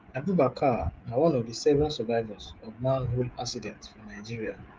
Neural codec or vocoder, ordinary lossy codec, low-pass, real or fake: codec, 16 kHz, 16 kbps, FreqCodec, smaller model; Opus, 24 kbps; 7.2 kHz; fake